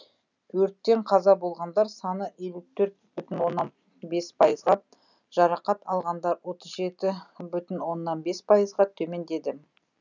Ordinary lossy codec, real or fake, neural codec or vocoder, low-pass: none; real; none; 7.2 kHz